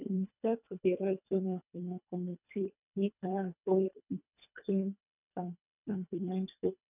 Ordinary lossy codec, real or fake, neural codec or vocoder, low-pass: none; fake; codec, 24 kHz, 1.5 kbps, HILCodec; 3.6 kHz